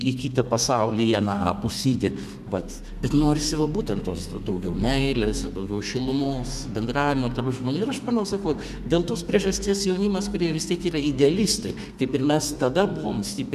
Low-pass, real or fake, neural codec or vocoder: 14.4 kHz; fake; codec, 32 kHz, 1.9 kbps, SNAC